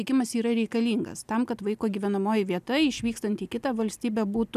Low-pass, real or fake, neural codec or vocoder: 14.4 kHz; real; none